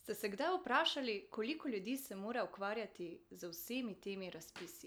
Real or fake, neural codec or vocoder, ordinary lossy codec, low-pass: real; none; none; none